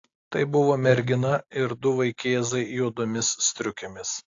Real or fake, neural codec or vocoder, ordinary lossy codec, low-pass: real; none; AAC, 48 kbps; 7.2 kHz